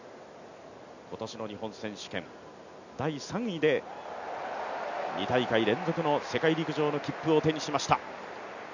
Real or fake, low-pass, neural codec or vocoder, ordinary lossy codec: real; 7.2 kHz; none; none